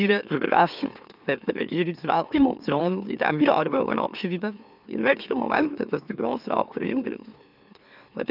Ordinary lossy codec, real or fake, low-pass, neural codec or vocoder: none; fake; 5.4 kHz; autoencoder, 44.1 kHz, a latent of 192 numbers a frame, MeloTTS